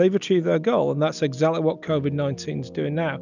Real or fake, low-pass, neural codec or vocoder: real; 7.2 kHz; none